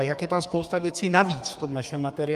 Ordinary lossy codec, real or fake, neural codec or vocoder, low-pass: Opus, 32 kbps; fake; codec, 32 kHz, 1.9 kbps, SNAC; 14.4 kHz